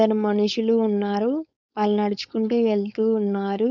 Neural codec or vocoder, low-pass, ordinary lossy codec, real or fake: codec, 16 kHz, 4.8 kbps, FACodec; 7.2 kHz; none; fake